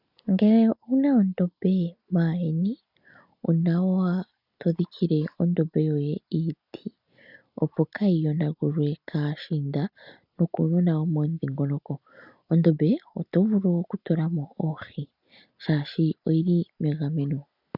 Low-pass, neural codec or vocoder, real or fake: 5.4 kHz; none; real